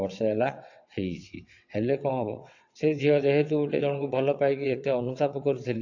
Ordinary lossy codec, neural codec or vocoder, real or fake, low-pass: AAC, 48 kbps; vocoder, 22.05 kHz, 80 mel bands, WaveNeXt; fake; 7.2 kHz